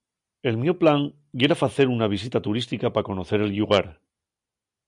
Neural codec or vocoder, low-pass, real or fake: none; 10.8 kHz; real